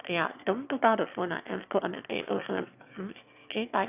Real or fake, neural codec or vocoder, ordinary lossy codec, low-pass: fake; autoencoder, 22.05 kHz, a latent of 192 numbers a frame, VITS, trained on one speaker; none; 3.6 kHz